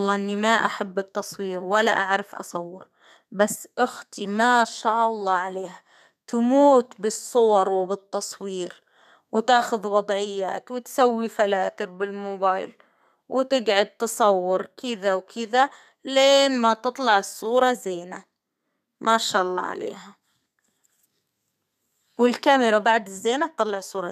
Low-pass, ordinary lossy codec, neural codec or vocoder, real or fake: 14.4 kHz; none; codec, 32 kHz, 1.9 kbps, SNAC; fake